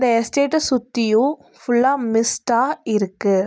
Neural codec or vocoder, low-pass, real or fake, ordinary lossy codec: none; none; real; none